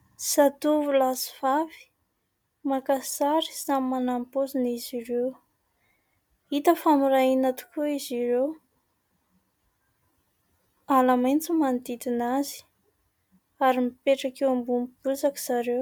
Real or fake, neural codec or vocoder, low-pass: real; none; 19.8 kHz